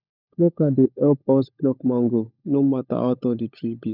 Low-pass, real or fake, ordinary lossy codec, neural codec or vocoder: 5.4 kHz; fake; none; codec, 16 kHz, 16 kbps, FunCodec, trained on LibriTTS, 50 frames a second